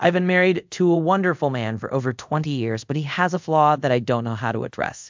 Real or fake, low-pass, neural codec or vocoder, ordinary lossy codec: fake; 7.2 kHz; codec, 24 kHz, 0.5 kbps, DualCodec; MP3, 64 kbps